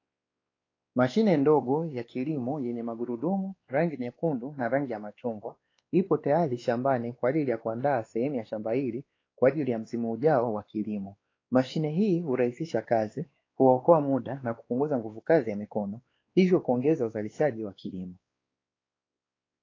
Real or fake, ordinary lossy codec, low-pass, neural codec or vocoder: fake; AAC, 32 kbps; 7.2 kHz; codec, 16 kHz, 2 kbps, X-Codec, WavLM features, trained on Multilingual LibriSpeech